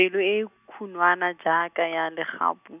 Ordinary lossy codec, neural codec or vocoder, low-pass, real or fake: none; none; 3.6 kHz; real